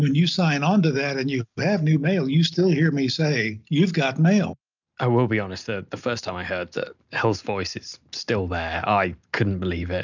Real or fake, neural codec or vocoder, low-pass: real; none; 7.2 kHz